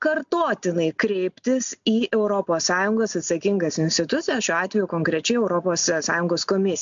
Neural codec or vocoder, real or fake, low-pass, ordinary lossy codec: none; real; 7.2 kHz; MP3, 64 kbps